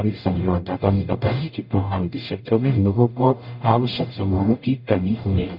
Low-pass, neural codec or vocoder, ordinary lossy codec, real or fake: 5.4 kHz; codec, 44.1 kHz, 0.9 kbps, DAC; none; fake